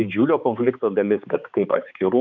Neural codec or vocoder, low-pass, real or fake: codec, 16 kHz, 4 kbps, X-Codec, HuBERT features, trained on balanced general audio; 7.2 kHz; fake